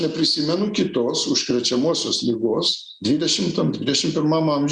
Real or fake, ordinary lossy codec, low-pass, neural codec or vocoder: real; Opus, 64 kbps; 9.9 kHz; none